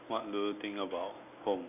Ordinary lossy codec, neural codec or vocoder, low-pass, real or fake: none; none; 3.6 kHz; real